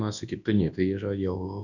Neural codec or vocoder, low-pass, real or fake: codec, 24 kHz, 0.9 kbps, WavTokenizer, large speech release; 7.2 kHz; fake